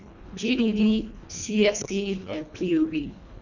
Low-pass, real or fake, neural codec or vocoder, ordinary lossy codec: 7.2 kHz; fake; codec, 24 kHz, 1.5 kbps, HILCodec; none